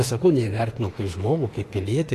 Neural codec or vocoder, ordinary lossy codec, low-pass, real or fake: autoencoder, 48 kHz, 32 numbers a frame, DAC-VAE, trained on Japanese speech; AAC, 48 kbps; 14.4 kHz; fake